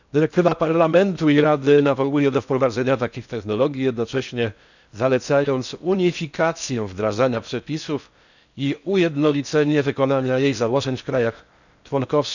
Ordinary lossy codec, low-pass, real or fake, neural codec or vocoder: none; 7.2 kHz; fake; codec, 16 kHz in and 24 kHz out, 0.6 kbps, FocalCodec, streaming, 4096 codes